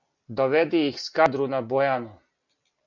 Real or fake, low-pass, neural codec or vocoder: real; 7.2 kHz; none